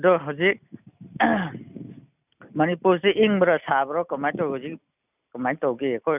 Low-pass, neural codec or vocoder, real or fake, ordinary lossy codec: 3.6 kHz; none; real; none